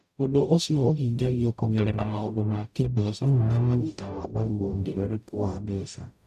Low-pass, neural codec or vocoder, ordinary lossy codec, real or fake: 14.4 kHz; codec, 44.1 kHz, 0.9 kbps, DAC; none; fake